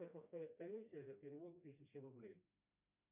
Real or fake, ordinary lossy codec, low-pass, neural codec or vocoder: fake; MP3, 32 kbps; 3.6 kHz; codec, 16 kHz, 1 kbps, FreqCodec, smaller model